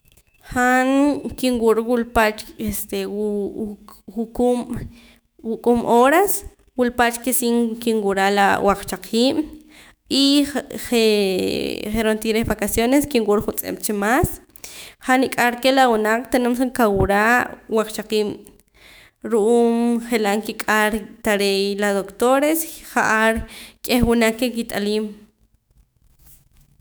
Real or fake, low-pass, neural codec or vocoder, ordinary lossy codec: fake; none; autoencoder, 48 kHz, 128 numbers a frame, DAC-VAE, trained on Japanese speech; none